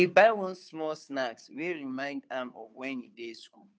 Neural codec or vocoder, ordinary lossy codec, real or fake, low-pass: codec, 16 kHz, 2 kbps, FunCodec, trained on Chinese and English, 25 frames a second; none; fake; none